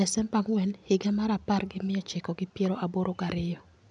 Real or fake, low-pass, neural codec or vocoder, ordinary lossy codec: real; 9.9 kHz; none; MP3, 96 kbps